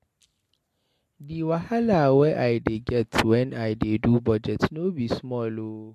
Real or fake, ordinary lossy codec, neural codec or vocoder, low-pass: real; MP3, 64 kbps; none; 14.4 kHz